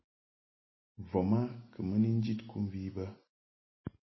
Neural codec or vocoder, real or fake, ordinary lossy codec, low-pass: none; real; MP3, 24 kbps; 7.2 kHz